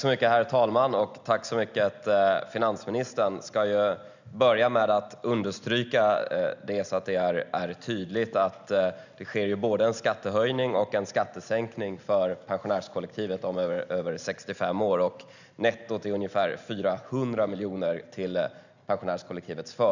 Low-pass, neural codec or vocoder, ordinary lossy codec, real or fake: 7.2 kHz; none; none; real